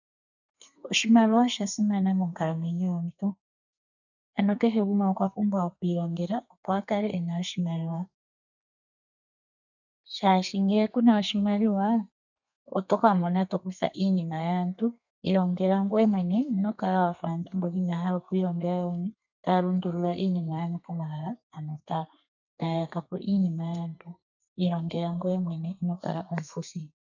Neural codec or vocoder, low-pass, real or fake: codec, 32 kHz, 1.9 kbps, SNAC; 7.2 kHz; fake